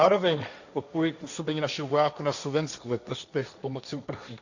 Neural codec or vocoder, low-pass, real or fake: codec, 16 kHz, 1.1 kbps, Voila-Tokenizer; 7.2 kHz; fake